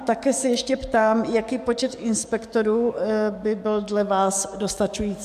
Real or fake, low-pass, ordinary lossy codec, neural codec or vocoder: fake; 14.4 kHz; MP3, 96 kbps; codec, 44.1 kHz, 7.8 kbps, DAC